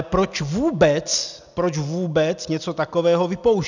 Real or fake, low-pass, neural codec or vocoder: real; 7.2 kHz; none